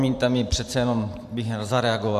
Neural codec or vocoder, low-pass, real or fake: vocoder, 44.1 kHz, 128 mel bands every 512 samples, BigVGAN v2; 14.4 kHz; fake